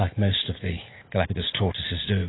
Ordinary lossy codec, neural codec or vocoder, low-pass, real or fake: AAC, 16 kbps; none; 7.2 kHz; real